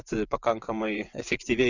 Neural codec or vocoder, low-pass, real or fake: vocoder, 24 kHz, 100 mel bands, Vocos; 7.2 kHz; fake